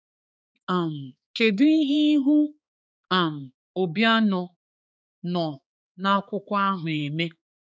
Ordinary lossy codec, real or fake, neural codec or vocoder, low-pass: none; fake; codec, 16 kHz, 4 kbps, X-Codec, HuBERT features, trained on balanced general audio; none